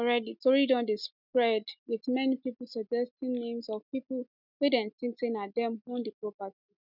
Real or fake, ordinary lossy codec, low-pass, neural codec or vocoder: real; none; 5.4 kHz; none